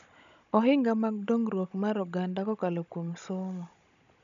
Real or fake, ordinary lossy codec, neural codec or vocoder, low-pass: fake; none; codec, 16 kHz, 16 kbps, FunCodec, trained on Chinese and English, 50 frames a second; 7.2 kHz